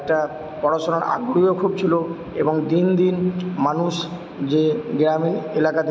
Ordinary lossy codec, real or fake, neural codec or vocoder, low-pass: none; real; none; none